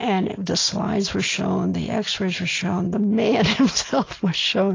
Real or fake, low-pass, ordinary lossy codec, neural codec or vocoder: real; 7.2 kHz; AAC, 32 kbps; none